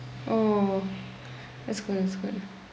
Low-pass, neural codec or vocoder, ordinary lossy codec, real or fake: none; none; none; real